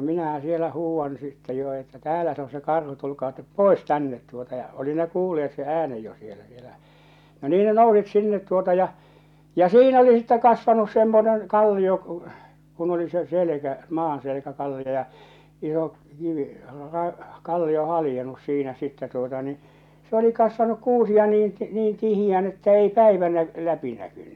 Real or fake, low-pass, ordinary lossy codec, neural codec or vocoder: real; 19.8 kHz; none; none